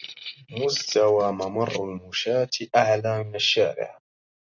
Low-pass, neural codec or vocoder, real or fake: 7.2 kHz; none; real